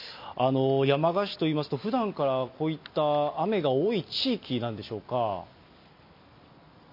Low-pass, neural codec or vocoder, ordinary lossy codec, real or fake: 5.4 kHz; none; MP3, 32 kbps; real